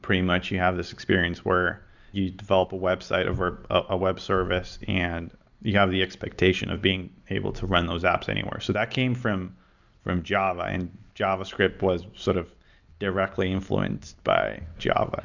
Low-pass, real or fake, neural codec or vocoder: 7.2 kHz; real; none